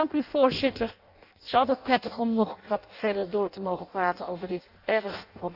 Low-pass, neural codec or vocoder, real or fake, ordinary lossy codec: 5.4 kHz; codec, 16 kHz in and 24 kHz out, 0.6 kbps, FireRedTTS-2 codec; fake; AAC, 48 kbps